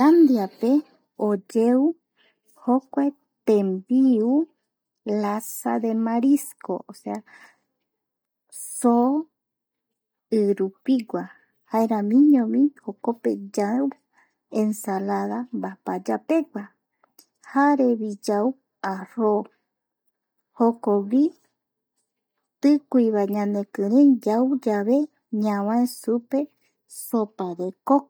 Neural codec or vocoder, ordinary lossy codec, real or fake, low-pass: none; none; real; none